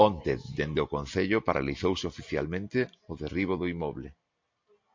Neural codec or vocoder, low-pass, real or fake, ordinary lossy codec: none; 7.2 kHz; real; MP3, 48 kbps